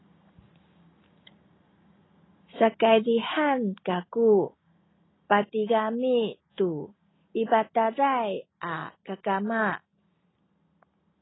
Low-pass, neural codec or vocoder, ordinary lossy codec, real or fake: 7.2 kHz; none; AAC, 16 kbps; real